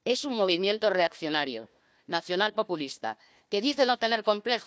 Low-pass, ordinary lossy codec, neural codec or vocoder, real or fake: none; none; codec, 16 kHz, 1 kbps, FunCodec, trained on Chinese and English, 50 frames a second; fake